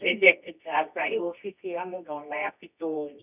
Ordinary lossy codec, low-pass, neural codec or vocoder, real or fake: none; 3.6 kHz; codec, 24 kHz, 0.9 kbps, WavTokenizer, medium music audio release; fake